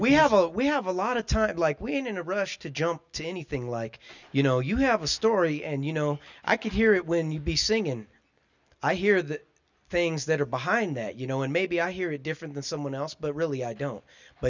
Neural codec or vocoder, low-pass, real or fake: none; 7.2 kHz; real